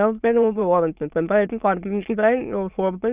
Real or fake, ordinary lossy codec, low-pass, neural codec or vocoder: fake; Opus, 64 kbps; 3.6 kHz; autoencoder, 22.05 kHz, a latent of 192 numbers a frame, VITS, trained on many speakers